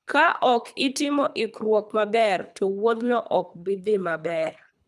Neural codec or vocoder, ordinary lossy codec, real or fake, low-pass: codec, 24 kHz, 3 kbps, HILCodec; none; fake; none